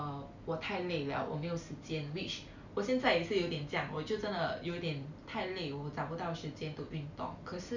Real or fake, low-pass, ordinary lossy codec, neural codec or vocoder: real; 7.2 kHz; none; none